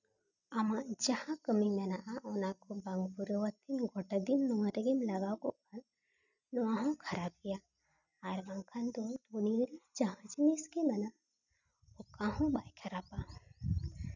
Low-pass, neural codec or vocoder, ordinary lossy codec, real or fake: 7.2 kHz; none; none; real